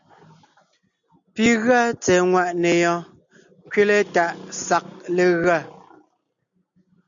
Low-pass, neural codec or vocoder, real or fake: 7.2 kHz; none; real